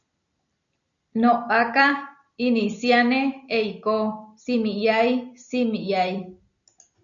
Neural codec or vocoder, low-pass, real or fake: none; 7.2 kHz; real